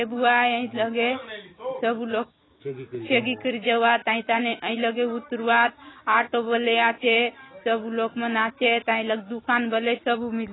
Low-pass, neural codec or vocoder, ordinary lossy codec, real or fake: 7.2 kHz; none; AAC, 16 kbps; real